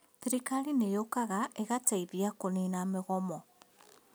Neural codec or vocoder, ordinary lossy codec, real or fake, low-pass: none; none; real; none